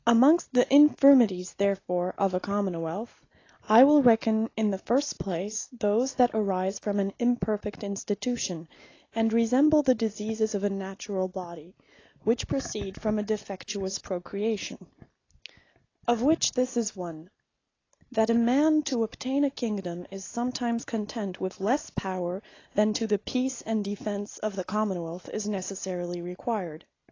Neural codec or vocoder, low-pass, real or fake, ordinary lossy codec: none; 7.2 kHz; real; AAC, 32 kbps